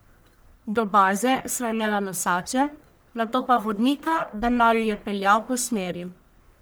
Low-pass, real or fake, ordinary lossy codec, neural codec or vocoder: none; fake; none; codec, 44.1 kHz, 1.7 kbps, Pupu-Codec